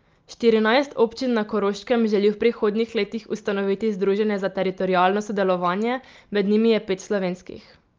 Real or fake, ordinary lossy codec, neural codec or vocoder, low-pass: real; Opus, 24 kbps; none; 7.2 kHz